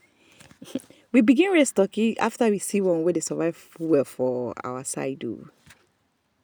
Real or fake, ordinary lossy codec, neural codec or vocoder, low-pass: real; none; none; none